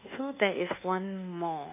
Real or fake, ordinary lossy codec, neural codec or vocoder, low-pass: fake; none; codec, 24 kHz, 1.2 kbps, DualCodec; 3.6 kHz